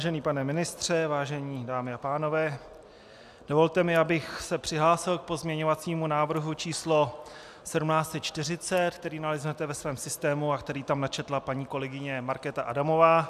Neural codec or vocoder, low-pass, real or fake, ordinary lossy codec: none; 14.4 kHz; real; AAC, 96 kbps